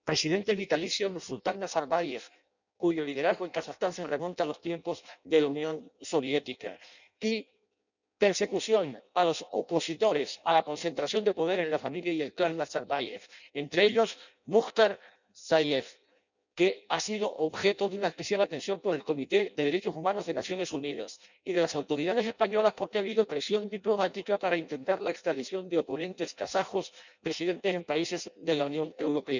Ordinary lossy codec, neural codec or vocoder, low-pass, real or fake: none; codec, 16 kHz in and 24 kHz out, 0.6 kbps, FireRedTTS-2 codec; 7.2 kHz; fake